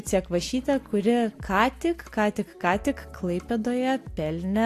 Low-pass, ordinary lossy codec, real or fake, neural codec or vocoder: 14.4 kHz; AAC, 64 kbps; real; none